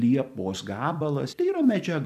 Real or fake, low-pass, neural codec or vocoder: fake; 14.4 kHz; vocoder, 44.1 kHz, 128 mel bands every 256 samples, BigVGAN v2